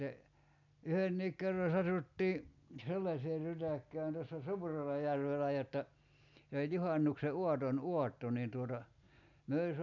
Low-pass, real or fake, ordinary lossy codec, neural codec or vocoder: 7.2 kHz; real; none; none